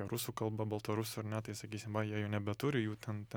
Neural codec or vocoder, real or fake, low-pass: none; real; 19.8 kHz